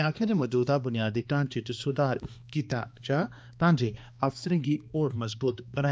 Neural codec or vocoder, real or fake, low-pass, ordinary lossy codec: codec, 16 kHz, 2 kbps, X-Codec, HuBERT features, trained on balanced general audio; fake; none; none